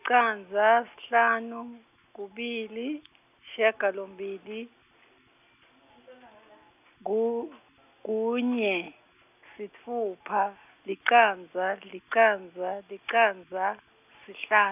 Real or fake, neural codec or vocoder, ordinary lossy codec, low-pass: real; none; none; 3.6 kHz